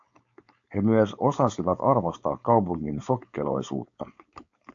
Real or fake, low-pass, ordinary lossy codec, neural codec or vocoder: fake; 7.2 kHz; AAC, 64 kbps; codec, 16 kHz, 4.8 kbps, FACodec